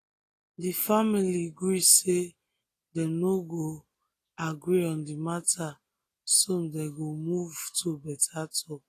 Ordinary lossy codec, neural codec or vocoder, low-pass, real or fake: AAC, 48 kbps; none; 14.4 kHz; real